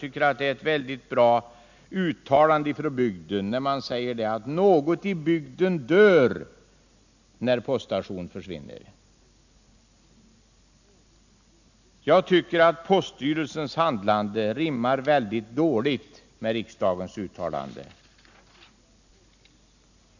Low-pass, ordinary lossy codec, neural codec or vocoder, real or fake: 7.2 kHz; none; none; real